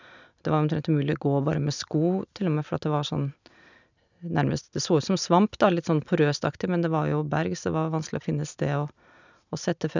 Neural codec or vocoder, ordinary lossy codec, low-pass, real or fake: none; none; 7.2 kHz; real